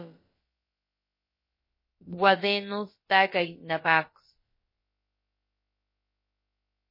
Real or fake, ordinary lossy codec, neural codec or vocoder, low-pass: fake; MP3, 24 kbps; codec, 16 kHz, about 1 kbps, DyCAST, with the encoder's durations; 5.4 kHz